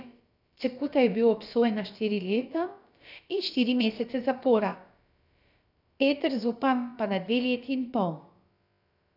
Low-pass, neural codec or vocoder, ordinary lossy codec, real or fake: 5.4 kHz; codec, 16 kHz, about 1 kbps, DyCAST, with the encoder's durations; none; fake